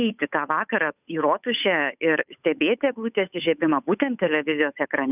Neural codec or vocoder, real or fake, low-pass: codec, 16 kHz, 8 kbps, FunCodec, trained on Chinese and English, 25 frames a second; fake; 3.6 kHz